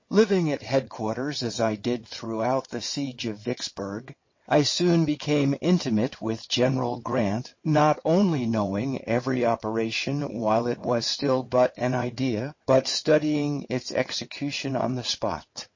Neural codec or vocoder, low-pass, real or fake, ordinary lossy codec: vocoder, 22.05 kHz, 80 mel bands, WaveNeXt; 7.2 kHz; fake; MP3, 32 kbps